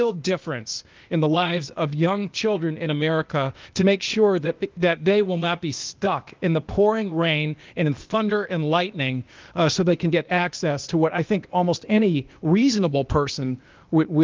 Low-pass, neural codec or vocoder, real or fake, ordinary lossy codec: 7.2 kHz; codec, 16 kHz, 0.8 kbps, ZipCodec; fake; Opus, 32 kbps